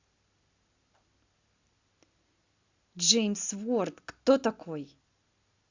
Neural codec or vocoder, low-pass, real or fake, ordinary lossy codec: none; 7.2 kHz; real; Opus, 64 kbps